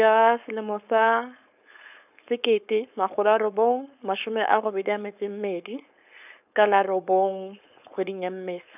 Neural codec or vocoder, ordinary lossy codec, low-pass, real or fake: codec, 16 kHz, 4.8 kbps, FACodec; none; 3.6 kHz; fake